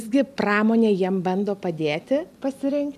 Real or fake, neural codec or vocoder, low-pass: real; none; 14.4 kHz